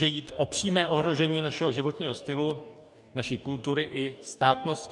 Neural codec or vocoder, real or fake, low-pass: codec, 44.1 kHz, 2.6 kbps, DAC; fake; 10.8 kHz